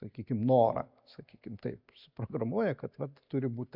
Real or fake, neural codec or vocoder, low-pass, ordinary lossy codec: real; none; 5.4 kHz; MP3, 48 kbps